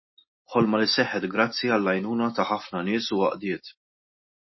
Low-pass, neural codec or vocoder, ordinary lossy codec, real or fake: 7.2 kHz; none; MP3, 24 kbps; real